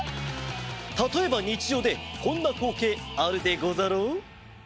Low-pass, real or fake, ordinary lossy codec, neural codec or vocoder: none; real; none; none